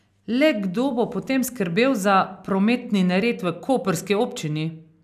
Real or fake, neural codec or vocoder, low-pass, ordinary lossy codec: real; none; 14.4 kHz; none